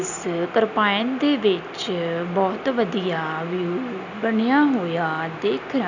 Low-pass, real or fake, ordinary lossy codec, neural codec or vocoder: 7.2 kHz; real; none; none